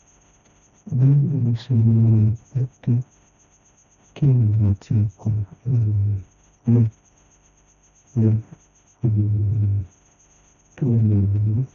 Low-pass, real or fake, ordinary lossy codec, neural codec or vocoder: 7.2 kHz; fake; none; codec, 16 kHz, 1 kbps, FreqCodec, smaller model